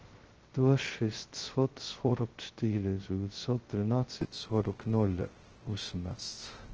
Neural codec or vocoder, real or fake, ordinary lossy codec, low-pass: codec, 16 kHz, 0.2 kbps, FocalCodec; fake; Opus, 16 kbps; 7.2 kHz